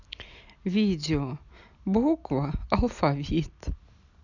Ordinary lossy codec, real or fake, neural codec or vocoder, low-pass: none; real; none; 7.2 kHz